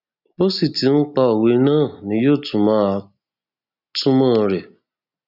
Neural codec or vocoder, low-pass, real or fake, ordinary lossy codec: none; 5.4 kHz; real; none